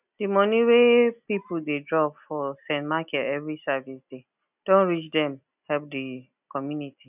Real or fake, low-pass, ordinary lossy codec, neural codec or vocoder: real; 3.6 kHz; none; none